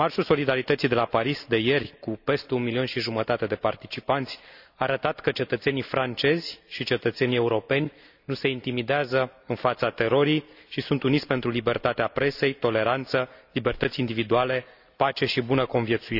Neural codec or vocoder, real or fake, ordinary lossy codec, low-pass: none; real; none; 5.4 kHz